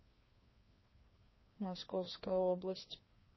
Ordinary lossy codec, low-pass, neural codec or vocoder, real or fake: MP3, 24 kbps; 7.2 kHz; codec, 16 kHz, 2 kbps, FreqCodec, larger model; fake